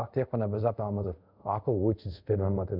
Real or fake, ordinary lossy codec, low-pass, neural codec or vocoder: fake; none; 5.4 kHz; codec, 24 kHz, 0.5 kbps, DualCodec